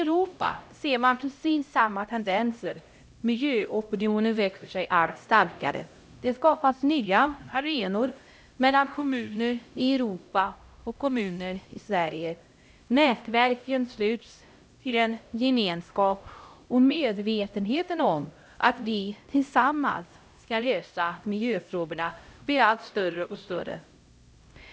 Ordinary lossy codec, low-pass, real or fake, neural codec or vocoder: none; none; fake; codec, 16 kHz, 0.5 kbps, X-Codec, HuBERT features, trained on LibriSpeech